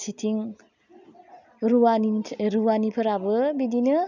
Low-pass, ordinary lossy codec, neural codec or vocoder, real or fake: 7.2 kHz; none; none; real